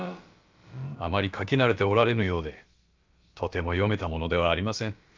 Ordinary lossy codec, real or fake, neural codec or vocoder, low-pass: Opus, 24 kbps; fake; codec, 16 kHz, about 1 kbps, DyCAST, with the encoder's durations; 7.2 kHz